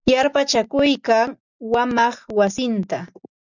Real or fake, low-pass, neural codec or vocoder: real; 7.2 kHz; none